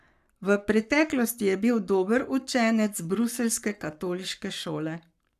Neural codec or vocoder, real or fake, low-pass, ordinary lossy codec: codec, 44.1 kHz, 7.8 kbps, Pupu-Codec; fake; 14.4 kHz; none